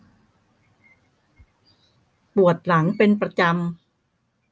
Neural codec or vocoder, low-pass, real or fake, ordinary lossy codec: none; none; real; none